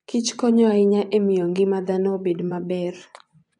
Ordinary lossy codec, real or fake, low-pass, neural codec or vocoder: none; fake; 10.8 kHz; vocoder, 24 kHz, 100 mel bands, Vocos